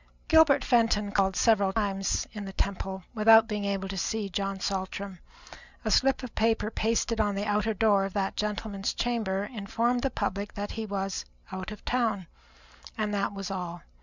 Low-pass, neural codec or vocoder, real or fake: 7.2 kHz; none; real